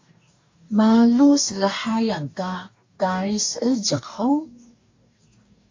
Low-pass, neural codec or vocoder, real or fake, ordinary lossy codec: 7.2 kHz; codec, 44.1 kHz, 2.6 kbps, DAC; fake; AAC, 48 kbps